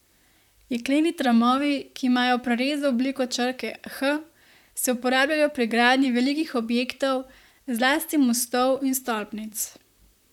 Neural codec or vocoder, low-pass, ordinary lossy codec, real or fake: vocoder, 44.1 kHz, 128 mel bands, Pupu-Vocoder; 19.8 kHz; none; fake